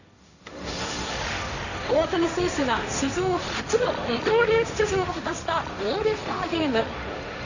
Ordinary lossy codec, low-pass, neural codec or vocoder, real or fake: none; 7.2 kHz; codec, 16 kHz, 1.1 kbps, Voila-Tokenizer; fake